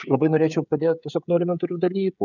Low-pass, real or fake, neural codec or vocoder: 7.2 kHz; fake; codec, 16 kHz, 16 kbps, FreqCodec, smaller model